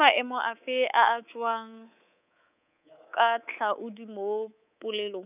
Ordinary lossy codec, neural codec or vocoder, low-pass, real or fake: none; codec, 24 kHz, 3.1 kbps, DualCodec; 3.6 kHz; fake